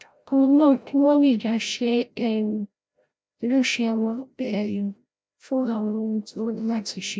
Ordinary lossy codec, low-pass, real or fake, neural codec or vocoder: none; none; fake; codec, 16 kHz, 0.5 kbps, FreqCodec, larger model